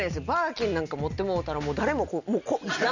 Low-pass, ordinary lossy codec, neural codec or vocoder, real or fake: 7.2 kHz; none; none; real